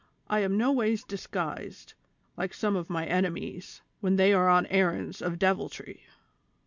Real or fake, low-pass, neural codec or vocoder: real; 7.2 kHz; none